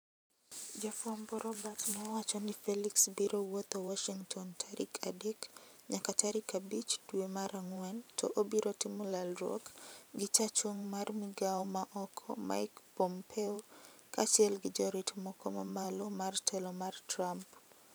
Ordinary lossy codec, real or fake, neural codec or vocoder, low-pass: none; fake; vocoder, 44.1 kHz, 128 mel bands every 512 samples, BigVGAN v2; none